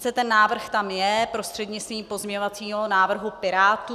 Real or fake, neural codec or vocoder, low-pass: real; none; 14.4 kHz